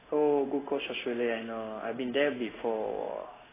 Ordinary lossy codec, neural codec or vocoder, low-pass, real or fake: AAC, 16 kbps; codec, 16 kHz in and 24 kHz out, 1 kbps, XY-Tokenizer; 3.6 kHz; fake